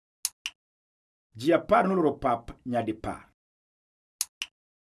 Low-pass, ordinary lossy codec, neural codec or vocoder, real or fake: none; none; none; real